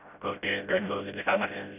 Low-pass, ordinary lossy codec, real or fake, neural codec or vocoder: 3.6 kHz; none; fake; codec, 16 kHz, 0.5 kbps, FreqCodec, smaller model